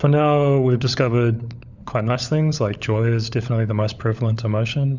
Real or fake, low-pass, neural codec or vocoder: fake; 7.2 kHz; codec, 16 kHz, 16 kbps, FunCodec, trained on LibriTTS, 50 frames a second